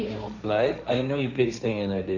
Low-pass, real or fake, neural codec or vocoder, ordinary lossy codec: 7.2 kHz; fake; codec, 16 kHz, 1.1 kbps, Voila-Tokenizer; none